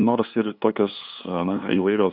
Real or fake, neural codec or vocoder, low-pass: fake; codec, 16 kHz, 2 kbps, FunCodec, trained on LibriTTS, 25 frames a second; 5.4 kHz